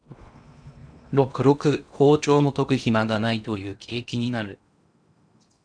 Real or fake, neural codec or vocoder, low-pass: fake; codec, 16 kHz in and 24 kHz out, 0.8 kbps, FocalCodec, streaming, 65536 codes; 9.9 kHz